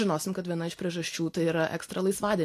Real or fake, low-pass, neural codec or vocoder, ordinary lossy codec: real; 14.4 kHz; none; AAC, 64 kbps